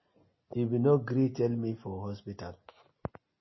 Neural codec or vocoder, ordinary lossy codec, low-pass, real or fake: none; MP3, 24 kbps; 7.2 kHz; real